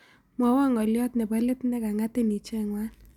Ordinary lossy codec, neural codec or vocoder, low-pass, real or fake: none; none; 19.8 kHz; real